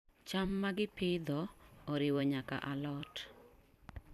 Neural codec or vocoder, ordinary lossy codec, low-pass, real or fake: vocoder, 44.1 kHz, 128 mel bands every 256 samples, BigVGAN v2; none; 14.4 kHz; fake